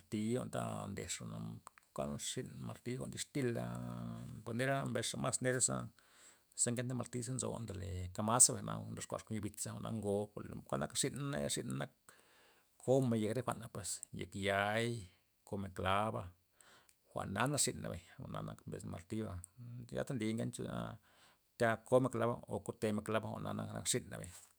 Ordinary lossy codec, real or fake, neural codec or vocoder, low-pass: none; fake; autoencoder, 48 kHz, 128 numbers a frame, DAC-VAE, trained on Japanese speech; none